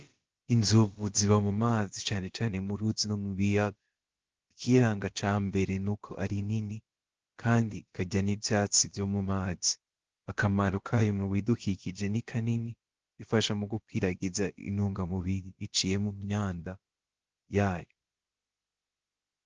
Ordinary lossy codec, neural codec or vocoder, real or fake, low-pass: Opus, 16 kbps; codec, 16 kHz, about 1 kbps, DyCAST, with the encoder's durations; fake; 7.2 kHz